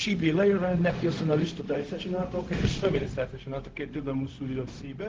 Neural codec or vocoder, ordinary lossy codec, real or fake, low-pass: codec, 16 kHz, 0.4 kbps, LongCat-Audio-Codec; Opus, 16 kbps; fake; 7.2 kHz